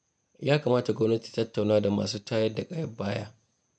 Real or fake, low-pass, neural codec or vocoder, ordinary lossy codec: fake; 9.9 kHz; vocoder, 48 kHz, 128 mel bands, Vocos; none